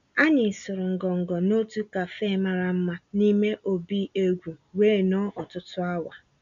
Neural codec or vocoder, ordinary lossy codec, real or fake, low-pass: none; none; real; 7.2 kHz